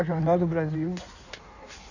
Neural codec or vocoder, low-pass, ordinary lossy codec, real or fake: codec, 16 kHz in and 24 kHz out, 1.1 kbps, FireRedTTS-2 codec; 7.2 kHz; none; fake